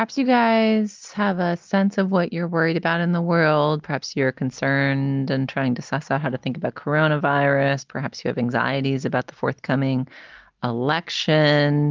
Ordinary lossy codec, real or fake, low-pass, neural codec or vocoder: Opus, 24 kbps; real; 7.2 kHz; none